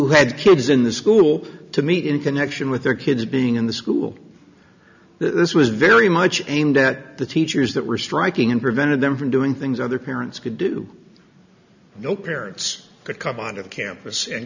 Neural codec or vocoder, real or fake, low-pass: none; real; 7.2 kHz